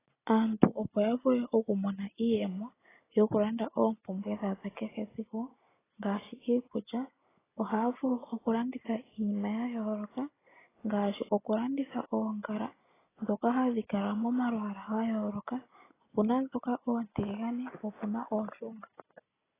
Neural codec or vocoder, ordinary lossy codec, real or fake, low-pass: none; AAC, 16 kbps; real; 3.6 kHz